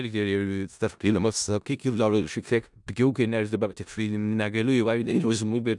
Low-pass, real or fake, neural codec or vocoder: 10.8 kHz; fake; codec, 16 kHz in and 24 kHz out, 0.4 kbps, LongCat-Audio-Codec, four codebook decoder